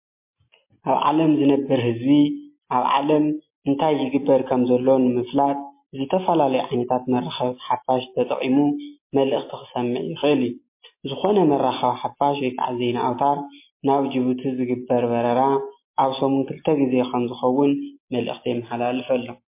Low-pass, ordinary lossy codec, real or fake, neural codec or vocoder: 3.6 kHz; MP3, 24 kbps; real; none